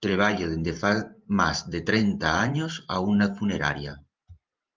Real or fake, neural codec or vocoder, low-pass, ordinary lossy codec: fake; vocoder, 44.1 kHz, 128 mel bands every 512 samples, BigVGAN v2; 7.2 kHz; Opus, 32 kbps